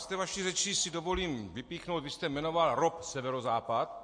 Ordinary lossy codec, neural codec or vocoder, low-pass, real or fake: MP3, 48 kbps; none; 9.9 kHz; real